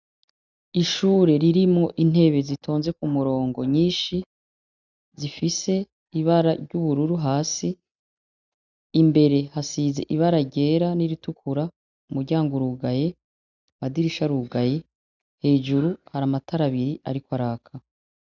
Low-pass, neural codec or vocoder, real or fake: 7.2 kHz; none; real